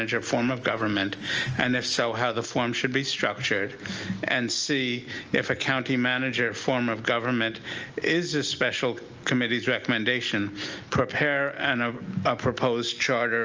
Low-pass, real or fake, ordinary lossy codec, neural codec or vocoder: 7.2 kHz; real; Opus, 24 kbps; none